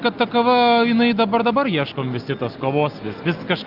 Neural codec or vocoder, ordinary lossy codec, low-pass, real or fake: none; Opus, 32 kbps; 5.4 kHz; real